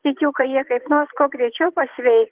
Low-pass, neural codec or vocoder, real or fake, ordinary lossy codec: 3.6 kHz; vocoder, 24 kHz, 100 mel bands, Vocos; fake; Opus, 16 kbps